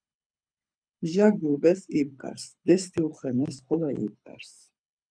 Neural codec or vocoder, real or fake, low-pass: codec, 24 kHz, 6 kbps, HILCodec; fake; 9.9 kHz